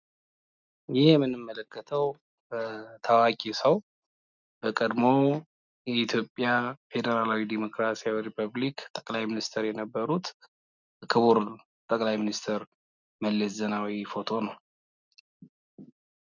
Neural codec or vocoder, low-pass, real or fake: none; 7.2 kHz; real